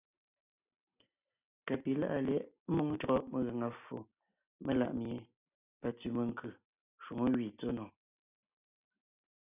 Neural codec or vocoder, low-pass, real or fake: none; 3.6 kHz; real